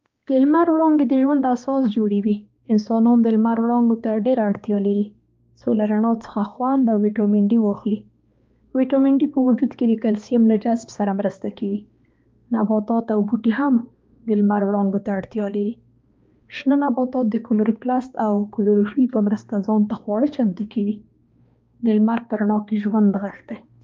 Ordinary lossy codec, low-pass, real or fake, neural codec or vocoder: Opus, 24 kbps; 7.2 kHz; fake; codec, 16 kHz, 2 kbps, X-Codec, HuBERT features, trained on balanced general audio